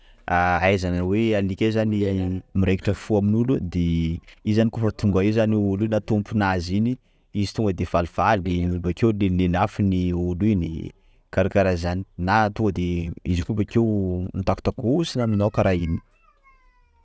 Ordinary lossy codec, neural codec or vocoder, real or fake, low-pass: none; none; real; none